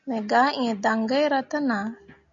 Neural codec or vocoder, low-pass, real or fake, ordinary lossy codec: none; 7.2 kHz; real; MP3, 64 kbps